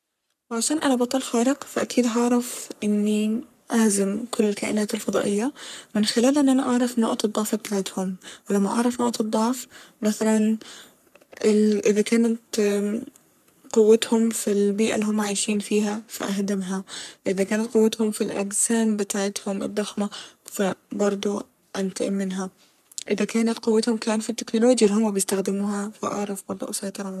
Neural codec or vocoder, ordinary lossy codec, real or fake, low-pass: codec, 44.1 kHz, 3.4 kbps, Pupu-Codec; none; fake; 14.4 kHz